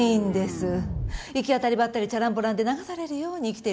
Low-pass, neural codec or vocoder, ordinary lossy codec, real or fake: none; none; none; real